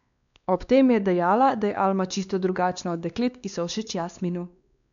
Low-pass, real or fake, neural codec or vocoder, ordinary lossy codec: 7.2 kHz; fake; codec, 16 kHz, 2 kbps, X-Codec, WavLM features, trained on Multilingual LibriSpeech; none